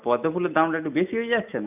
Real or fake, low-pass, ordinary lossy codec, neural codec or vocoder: real; 3.6 kHz; none; none